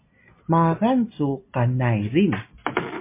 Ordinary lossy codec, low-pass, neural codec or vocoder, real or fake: MP3, 24 kbps; 3.6 kHz; none; real